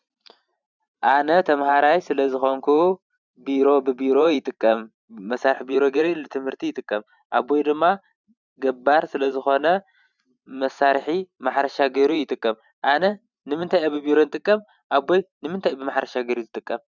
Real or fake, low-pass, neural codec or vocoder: fake; 7.2 kHz; vocoder, 44.1 kHz, 128 mel bands every 512 samples, BigVGAN v2